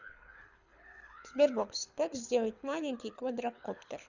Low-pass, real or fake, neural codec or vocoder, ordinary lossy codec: 7.2 kHz; fake; codec, 24 kHz, 6 kbps, HILCodec; none